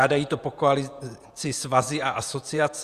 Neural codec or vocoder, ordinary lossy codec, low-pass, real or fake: vocoder, 48 kHz, 128 mel bands, Vocos; Opus, 64 kbps; 14.4 kHz; fake